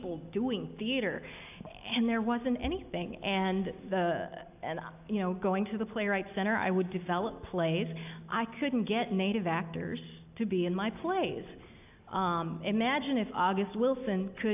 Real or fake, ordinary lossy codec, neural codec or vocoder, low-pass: real; AAC, 32 kbps; none; 3.6 kHz